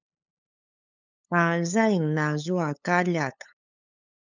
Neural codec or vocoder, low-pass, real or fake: codec, 16 kHz, 8 kbps, FunCodec, trained on LibriTTS, 25 frames a second; 7.2 kHz; fake